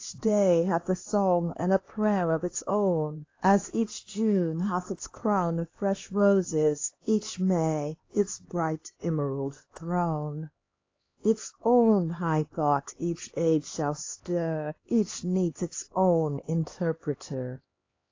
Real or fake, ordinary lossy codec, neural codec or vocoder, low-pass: fake; AAC, 32 kbps; codec, 16 kHz, 2 kbps, X-Codec, HuBERT features, trained on LibriSpeech; 7.2 kHz